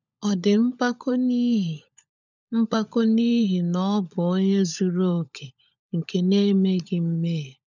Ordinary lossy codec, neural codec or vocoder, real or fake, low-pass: none; codec, 16 kHz, 16 kbps, FunCodec, trained on LibriTTS, 50 frames a second; fake; 7.2 kHz